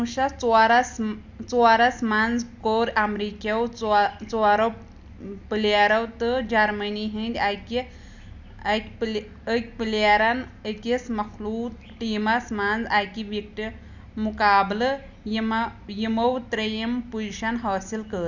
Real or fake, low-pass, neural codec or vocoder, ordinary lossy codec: real; 7.2 kHz; none; none